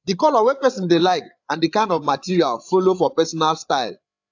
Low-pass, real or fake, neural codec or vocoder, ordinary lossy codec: 7.2 kHz; fake; codec, 16 kHz, 8 kbps, FreqCodec, larger model; AAC, 48 kbps